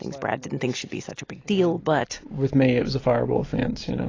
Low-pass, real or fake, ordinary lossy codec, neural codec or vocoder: 7.2 kHz; real; AAC, 32 kbps; none